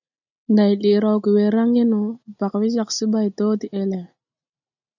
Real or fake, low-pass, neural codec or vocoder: real; 7.2 kHz; none